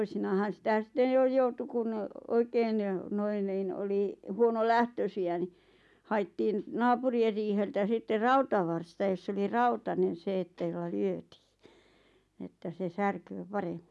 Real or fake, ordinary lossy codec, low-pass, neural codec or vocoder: real; none; none; none